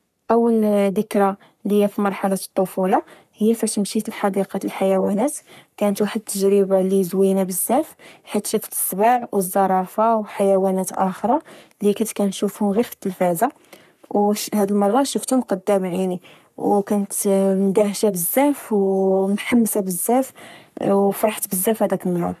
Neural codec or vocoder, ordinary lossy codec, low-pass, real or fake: codec, 44.1 kHz, 3.4 kbps, Pupu-Codec; none; 14.4 kHz; fake